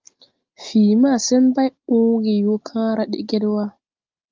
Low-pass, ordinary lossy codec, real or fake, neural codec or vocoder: 7.2 kHz; Opus, 32 kbps; real; none